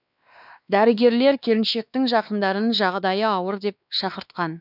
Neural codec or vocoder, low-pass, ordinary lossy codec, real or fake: codec, 16 kHz, 2 kbps, X-Codec, WavLM features, trained on Multilingual LibriSpeech; 5.4 kHz; none; fake